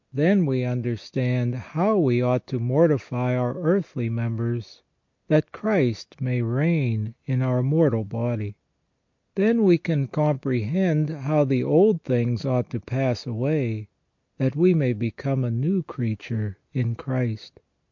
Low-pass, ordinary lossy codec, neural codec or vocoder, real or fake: 7.2 kHz; MP3, 48 kbps; none; real